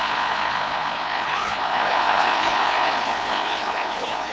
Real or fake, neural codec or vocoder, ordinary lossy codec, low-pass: fake; codec, 16 kHz, 2 kbps, FreqCodec, larger model; none; none